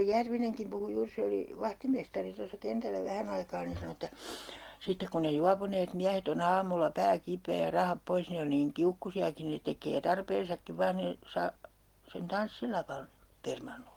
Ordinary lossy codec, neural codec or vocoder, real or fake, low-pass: Opus, 24 kbps; none; real; 19.8 kHz